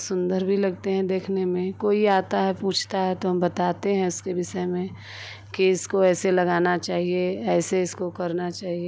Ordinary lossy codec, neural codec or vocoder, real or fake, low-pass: none; none; real; none